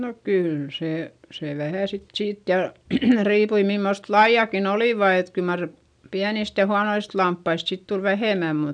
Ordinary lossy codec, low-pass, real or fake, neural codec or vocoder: none; 9.9 kHz; real; none